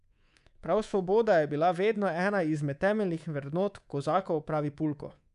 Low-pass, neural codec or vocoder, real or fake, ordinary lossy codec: 10.8 kHz; codec, 24 kHz, 3.1 kbps, DualCodec; fake; none